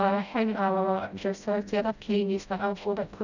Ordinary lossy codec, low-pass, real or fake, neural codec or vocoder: none; 7.2 kHz; fake; codec, 16 kHz, 0.5 kbps, FreqCodec, smaller model